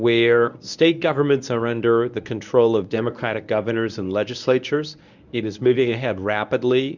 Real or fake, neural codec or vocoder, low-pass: fake; codec, 24 kHz, 0.9 kbps, WavTokenizer, medium speech release version 1; 7.2 kHz